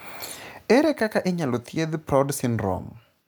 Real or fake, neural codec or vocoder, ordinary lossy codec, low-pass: real; none; none; none